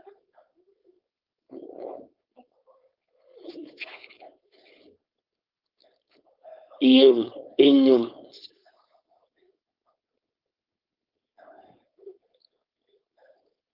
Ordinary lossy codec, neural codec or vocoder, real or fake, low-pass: Opus, 24 kbps; codec, 16 kHz, 4.8 kbps, FACodec; fake; 5.4 kHz